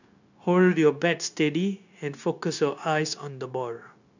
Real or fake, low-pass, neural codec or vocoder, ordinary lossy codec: fake; 7.2 kHz; codec, 16 kHz, 0.9 kbps, LongCat-Audio-Codec; none